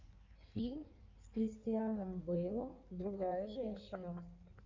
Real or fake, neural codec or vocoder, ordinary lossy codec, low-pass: fake; codec, 16 kHz in and 24 kHz out, 1.1 kbps, FireRedTTS-2 codec; MP3, 64 kbps; 7.2 kHz